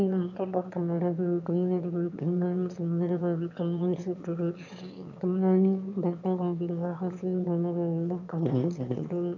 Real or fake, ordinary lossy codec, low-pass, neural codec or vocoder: fake; none; 7.2 kHz; autoencoder, 22.05 kHz, a latent of 192 numbers a frame, VITS, trained on one speaker